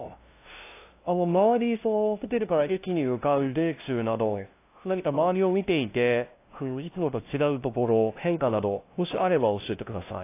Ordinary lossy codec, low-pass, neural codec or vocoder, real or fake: AAC, 24 kbps; 3.6 kHz; codec, 16 kHz, 0.5 kbps, FunCodec, trained on LibriTTS, 25 frames a second; fake